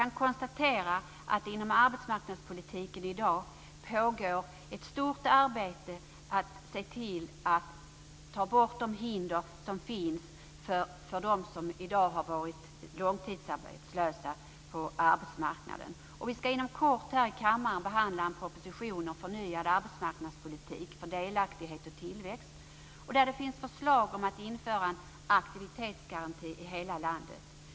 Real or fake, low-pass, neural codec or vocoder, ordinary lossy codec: real; none; none; none